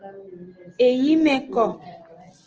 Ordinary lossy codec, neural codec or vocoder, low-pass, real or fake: Opus, 16 kbps; none; 7.2 kHz; real